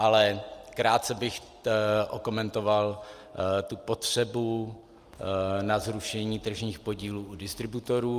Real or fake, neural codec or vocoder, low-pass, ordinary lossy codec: real; none; 14.4 kHz; Opus, 32 kbps